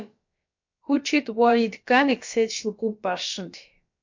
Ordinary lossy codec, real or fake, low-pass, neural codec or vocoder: MP3, 48 kbps; fake; 7.2 kHz; codec, 16 kHz, about 1 kbps, DyCAST, with the encoder's durations